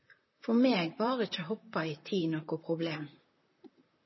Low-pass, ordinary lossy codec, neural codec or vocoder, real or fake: 7.2 kHz; MP3, 24 kbps; vocoder, 44.1 kHz, 128 mel bands, Pupu-Vocoder; fake